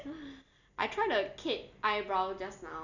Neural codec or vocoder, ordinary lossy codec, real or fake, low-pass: none; none; real; 7.2 kHz